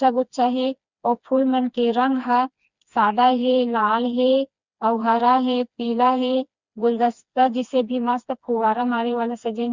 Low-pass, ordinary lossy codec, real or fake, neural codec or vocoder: 7.2 kHz; Opus, 64 kbps; fake; codec, 16 kHz, 2 kbps, FreqCodec, smaller model